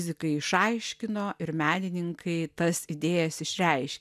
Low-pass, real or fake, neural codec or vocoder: 14.4 kHz; real; none